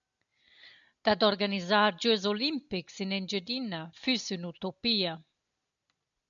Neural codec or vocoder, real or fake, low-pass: none; real; 7.2 kHz